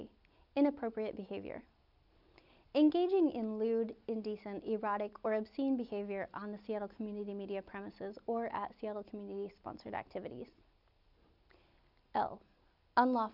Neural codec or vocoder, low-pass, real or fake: none; 5.4 kHz; real